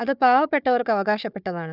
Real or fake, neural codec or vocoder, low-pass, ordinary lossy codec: fake; codec, 16 kHz, 4 kbps, FunCodec, trained on Chinese and English, 50 frames a second; 5.4 kHz; none